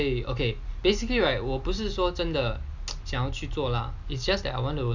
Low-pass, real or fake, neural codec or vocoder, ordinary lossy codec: 7.2 kHz; real; none; none